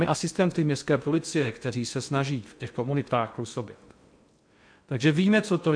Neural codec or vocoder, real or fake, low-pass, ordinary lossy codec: codec, 16 kHz in and 24 kHz out, 0.6 kbps, FocalCodec, streaming, 2048 codes; fake; 9.9 kHz; MP3, 64 kbps